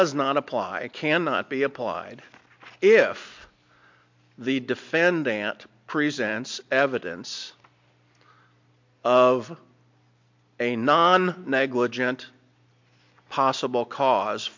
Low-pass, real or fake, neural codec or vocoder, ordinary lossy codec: 7.2 kHz; real; none; MP3, 48 kbps